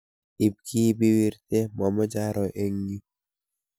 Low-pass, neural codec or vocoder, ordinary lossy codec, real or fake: none; none; none; real